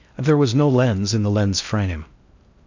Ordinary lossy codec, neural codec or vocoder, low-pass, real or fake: MP3, 64 kbps; codec, 16 kHz in and 24 kHz out, 0.6 kbps, FocalCodec, streaming, 4096 codes; 7.2 kHz; fake